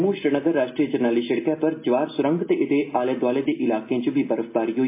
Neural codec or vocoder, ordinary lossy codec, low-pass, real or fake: none; MP3, 24 kbps; 3.6 kHz; real